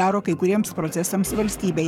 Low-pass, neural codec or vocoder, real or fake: 19.8 kHz; codec, 44.1 kHz, 7.8 kbps, Pupu-Codec; fake